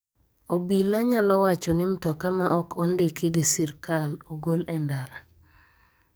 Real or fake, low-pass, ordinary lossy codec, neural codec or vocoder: fake; none; none; codec, 44.1 kHz, 2.6 kbps, SNAC